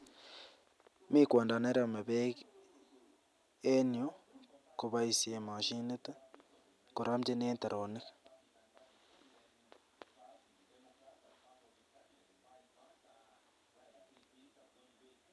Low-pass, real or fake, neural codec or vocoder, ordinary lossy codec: none; real; none; none